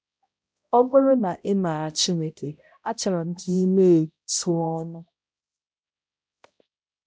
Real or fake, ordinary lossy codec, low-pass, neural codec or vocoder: fake; none; none; codec, 16 kHz, 0.5 kbps, X-Codec, HuBERT features, trained on balanced general audio